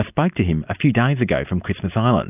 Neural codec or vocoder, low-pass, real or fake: none; 3.6 kHz; real